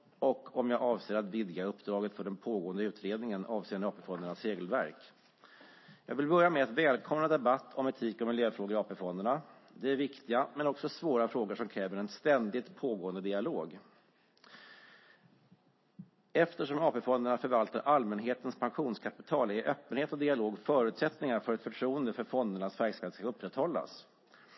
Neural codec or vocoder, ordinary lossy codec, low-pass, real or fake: none; MP3, 24 kbps; 7.2 kHz; real